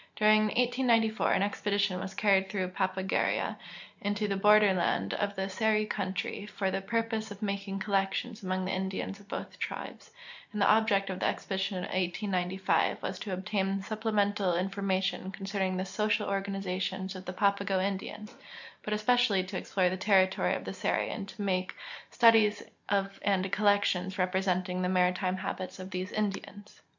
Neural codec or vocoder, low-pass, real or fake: none; 7.2 kHz; real